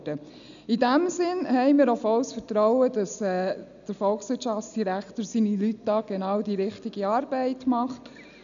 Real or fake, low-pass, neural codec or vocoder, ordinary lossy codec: real; 7.2 kHz; none; none